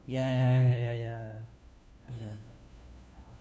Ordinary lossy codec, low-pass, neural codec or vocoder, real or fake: none; none; codec, 16 kHz, 1 kbps, FunCodec, trained on LibriTTS, 50 frames a second; fake